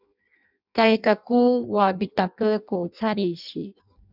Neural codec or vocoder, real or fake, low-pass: codec, 16 kHz in and 24 kHz out, 0.6 kbps, FireRedTTS-2 codec; fake; 5.4 kHz